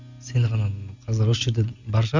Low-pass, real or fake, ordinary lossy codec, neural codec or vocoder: 7.2 kHz; real; Opus, 64 kbps; none